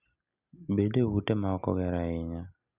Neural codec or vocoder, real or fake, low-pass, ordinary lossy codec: none; real; 3.6 kHz; Opus, 64 kbps